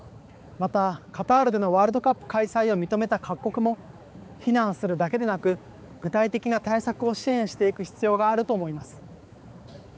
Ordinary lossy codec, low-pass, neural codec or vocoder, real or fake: none; none; codec, 16 kHz, 4 kbps, X-Codec, HuBERT features, trained on balanced general audio; fake